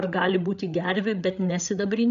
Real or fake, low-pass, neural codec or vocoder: fake; 7.2 kHz; codec, 16 kHz, 8 kbps, FreqCodec, larger model